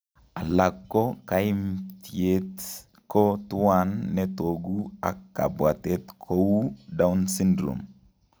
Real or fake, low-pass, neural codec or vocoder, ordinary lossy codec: real; none; none; none